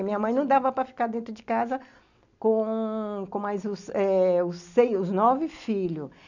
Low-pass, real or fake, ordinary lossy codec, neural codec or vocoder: 7.2 kHz; real; none; none